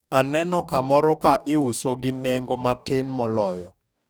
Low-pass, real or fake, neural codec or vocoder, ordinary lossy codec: none; fake; codec, 44.1 kHz, 2.6 kbps, DAC; none